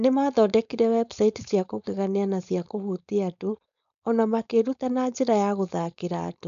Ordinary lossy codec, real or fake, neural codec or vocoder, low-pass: AAC, 96 kbps; fake; codec, 16 kHz, 4.8 kbps, FACodec; 7.2 kHz